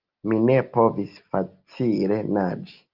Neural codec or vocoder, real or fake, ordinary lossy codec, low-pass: none; real; Opus, 32 kbps; 5.4 kHz